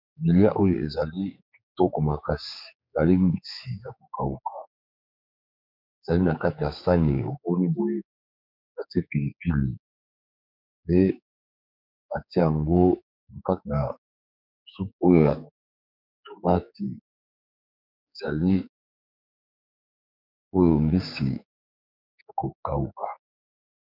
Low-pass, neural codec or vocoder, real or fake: 5.4 kHz; codec, 16 kHz, 4 kbps, X-Codec, HuBERT features, trained on general audio; fake